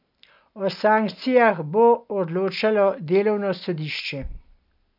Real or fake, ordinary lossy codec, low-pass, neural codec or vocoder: real; none; 5.4 kHz; none